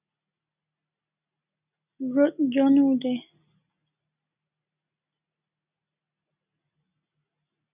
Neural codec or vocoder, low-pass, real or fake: none; 3.6 kHz; real